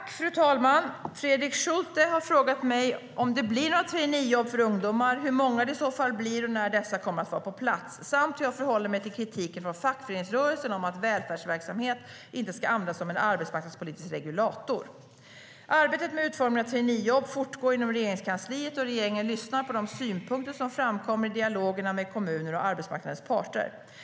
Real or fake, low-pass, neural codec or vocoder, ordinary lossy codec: real; none; none; none